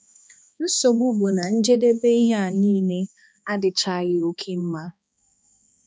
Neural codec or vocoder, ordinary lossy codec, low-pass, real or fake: codec, 16 kHz, 2 kbps, X-Codec, HuBERT features, trained on balanced general audio; none; none; fake